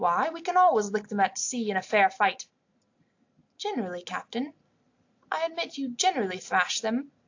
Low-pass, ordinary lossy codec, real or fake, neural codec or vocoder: 7.2 kHz; AAC, 48 kbps; real; none